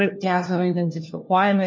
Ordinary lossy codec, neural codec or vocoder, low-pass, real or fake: MP3, 32 kbps; codec, 16 kHz, 1 kbps, FunCodec, trained on LibriTTS, 50 frames a second; 7.2 kHz; fake